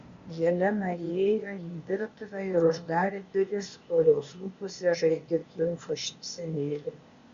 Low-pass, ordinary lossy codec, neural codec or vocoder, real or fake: 7.2 kHz; Opus, 64 kbps; codec, 16 kHz, 0.8 kbps, ZipCodec; fake